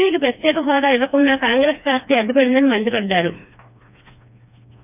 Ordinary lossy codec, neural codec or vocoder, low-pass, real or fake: none; codec, 16 kHz, 2 kbps, FreqCodec, smaller model; 3.6 kHz; fake